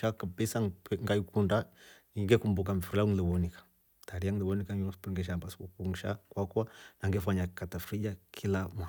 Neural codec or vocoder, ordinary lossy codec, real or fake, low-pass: none; none; real; none